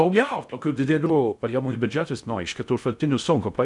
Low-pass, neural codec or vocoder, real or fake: 10.8 kHz; codec, 16 kHz in and 24 kHz out, 0.6 kbps, FocalCodec, streaming, 2048 codes; fake